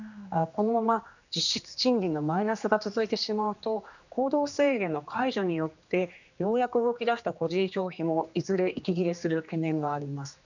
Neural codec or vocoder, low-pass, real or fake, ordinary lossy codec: codec, 16 kHz, 2 kbps, X-Codec, HuBERT features, trained on general audio; 7.2 kHz; fake; none